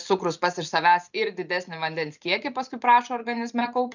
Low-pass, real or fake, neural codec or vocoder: 7.2 kHz; real; none